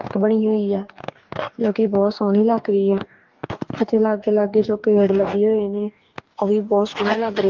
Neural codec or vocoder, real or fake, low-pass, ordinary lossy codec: codec, 44.1 kHz, 2.6 kbps, DAC; fake; 7.2 kHz; Opus, 32 kbps